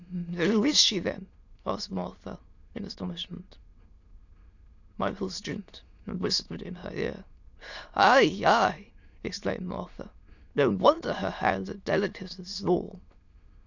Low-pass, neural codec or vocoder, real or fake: 7.2 kHz; autoencoder, 22.05 kHz, a latent of 192 numbers a frame, VITS, trained on many speakers; fake